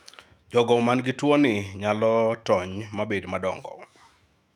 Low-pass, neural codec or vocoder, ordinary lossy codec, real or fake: 19.8 kHz; autoencoder, 48 kHz, 128 numbers a frame, DAC-VAE, trained on Japanese speech; none; fake